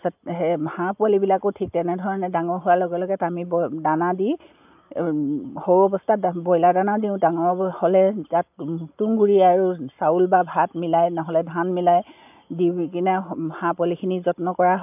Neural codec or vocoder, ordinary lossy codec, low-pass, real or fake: none; AAC, 32 kbps; 3.6 kHz; real